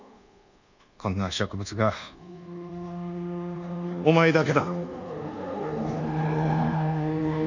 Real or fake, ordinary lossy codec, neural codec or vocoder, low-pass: fake; none; codec, 24 kHz, 1.2 kbps, DualCodec; 7.2 kHz